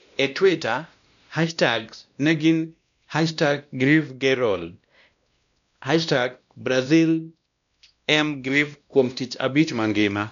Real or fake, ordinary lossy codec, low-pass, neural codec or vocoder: fake; none; 7.2 kHz; codec, 16 kHz, 1 kbps, X-Codec, WavLM features, trained on Multilingual LibriSpeech